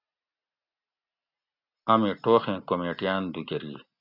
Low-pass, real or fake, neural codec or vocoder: 5.4 kHz; real; none